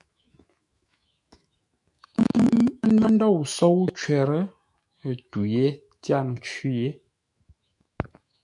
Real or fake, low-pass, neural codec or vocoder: fake; 10.8 kHz; autoencoder, 48 kHz, 128 numbers a frame, DAC-VAE, trained on Japanese speech